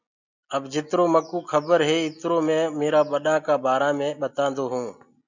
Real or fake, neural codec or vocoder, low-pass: real; none; 7.2 kHz